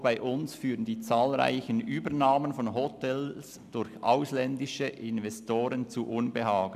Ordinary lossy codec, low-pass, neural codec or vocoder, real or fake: none; 14.4 kHz; none; real